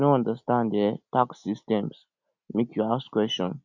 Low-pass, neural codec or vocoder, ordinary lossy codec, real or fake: 7.2 kHz; none; none; real